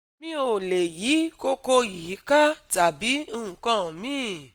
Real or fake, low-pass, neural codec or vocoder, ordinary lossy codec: real; 19.8 kHz; none; Opus, 32 kbps